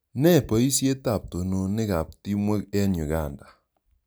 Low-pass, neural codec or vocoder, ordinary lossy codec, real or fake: none; none; none; real